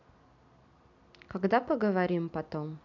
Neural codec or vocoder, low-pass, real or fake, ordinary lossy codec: none; 7.2 kHz; real; none